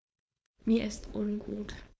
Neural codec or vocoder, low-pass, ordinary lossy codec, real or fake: codec, 16 kHz, 4.8 kbps, FACodec; none; none; fake